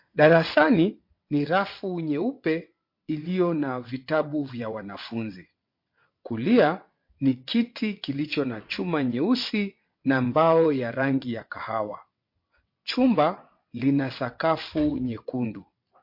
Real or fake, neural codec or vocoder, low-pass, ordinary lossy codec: fake; vocoder, 22.05 kHz, 80 mel bands, WaveNeXt; 5.4 kHz; MP3, 32 kbps